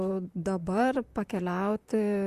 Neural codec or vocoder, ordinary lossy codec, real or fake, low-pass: vocoder, 44.1 kHz, 128 mel bands, Pupu-Vocoder; Opus, 64 kbps; fake; 14.4 kHz